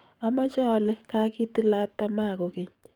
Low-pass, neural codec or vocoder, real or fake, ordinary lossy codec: 19.8 kHz; codec, 44.1 kHz, 7.8 kbps, DAC; fake; none